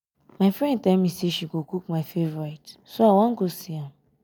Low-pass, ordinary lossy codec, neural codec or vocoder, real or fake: none; none; none; real